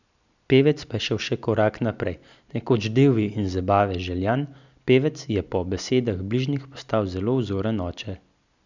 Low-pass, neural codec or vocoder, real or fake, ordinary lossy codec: 7.2 kHz; none; real; none